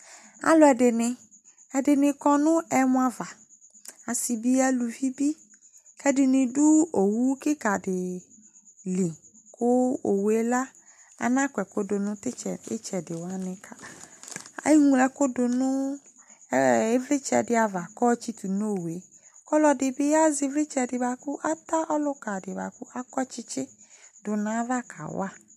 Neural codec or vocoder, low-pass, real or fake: none; 14.4 kHz; real